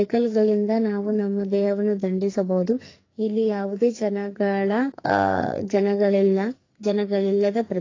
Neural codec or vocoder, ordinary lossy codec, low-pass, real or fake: codec, 44.1 kHz, 2.6 kbps, SNAC; AAC, 32 kbps; 7.2 kHz; fake